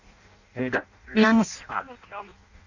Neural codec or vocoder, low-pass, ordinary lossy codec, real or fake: codec, 16 kHz in and 24 kHz out, 0.6 kbps, FireRedTTS-2 codec; 7.2 kHz; none; fake